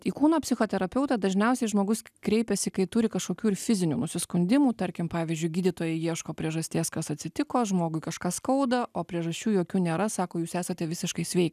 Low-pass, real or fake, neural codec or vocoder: 14.4 kHz; real; none